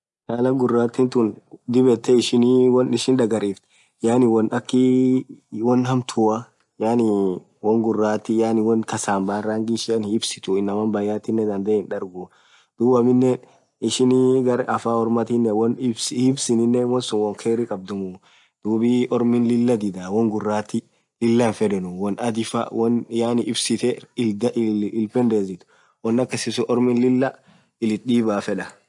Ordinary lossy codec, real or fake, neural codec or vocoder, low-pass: MP3, 64 kbps; real; none; 10.8 kHz